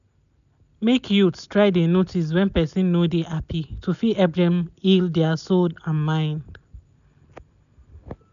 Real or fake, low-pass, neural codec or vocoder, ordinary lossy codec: real; 7.2 kHz; none; none